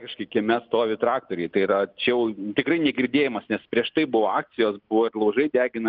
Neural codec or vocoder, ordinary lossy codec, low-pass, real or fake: none; Opus, 24 kbps; 5.4 kHz; real